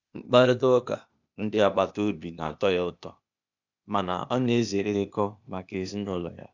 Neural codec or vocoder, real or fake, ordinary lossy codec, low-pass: codec, 16 kHz, 0.8 kbps, ZipCodec; fake; none; 7.2 kHz